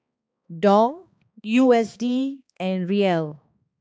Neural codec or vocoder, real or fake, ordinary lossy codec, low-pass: codec, 16 kHz, 2 kbps, X-Codec, HuBERT features, trained on balanced general audio; fake; none; none